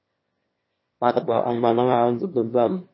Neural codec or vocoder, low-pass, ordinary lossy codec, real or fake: autoencoder, 22.05 kHz, a latent of 192 numbers a frame, VITS, trained on one speaker; 7.2 kHz; MP3, 24 kbps; fake